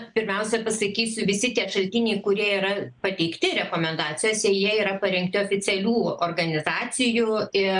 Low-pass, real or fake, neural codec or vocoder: 9.9 kHz; real; none